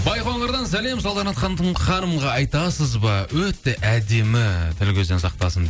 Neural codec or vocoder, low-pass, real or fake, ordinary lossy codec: none; none; real; none